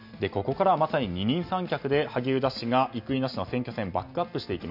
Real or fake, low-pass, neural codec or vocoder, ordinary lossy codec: real; 5.4 kHz; none; none